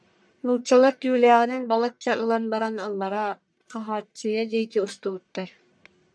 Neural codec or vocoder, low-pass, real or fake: codec, 44.1 kHz, 1.7 kbps, Pupu-Codec; 9.9 kHz; fake